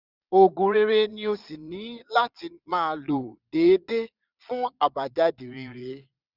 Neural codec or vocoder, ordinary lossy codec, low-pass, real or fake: vocoder, 22.05 kHz, 80 mel bands, Vocos; none; 5.4 kHz; fake